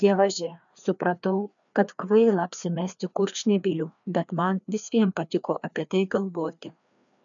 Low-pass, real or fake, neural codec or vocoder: 7.2 kHz; fake; codec, 16 kHz, 2 kbps, FreqCodec, larger model